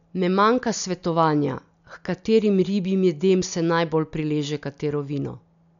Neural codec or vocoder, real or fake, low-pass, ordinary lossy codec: none; real; 7.2 kHz; none